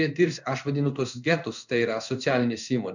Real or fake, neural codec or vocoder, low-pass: fake; codec, 16 kHz in and 24 kHz out, 1 kbps, XY-Tokenizer; 7.2 kHz